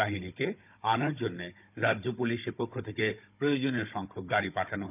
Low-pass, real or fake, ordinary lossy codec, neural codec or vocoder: 3.6 kHz; fake; none; codec, 16 kHz, 16 kbps, FunCodec, trained on Chinese and English, 50 frames a second